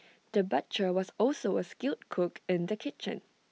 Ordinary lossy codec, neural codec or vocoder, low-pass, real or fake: none; none; none; real